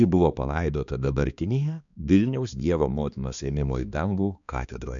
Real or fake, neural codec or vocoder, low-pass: fake; codec, 16 kHz, 2 kbps, X-Codec, HuBERT features, trained on balanced general audio; 7.2 kHz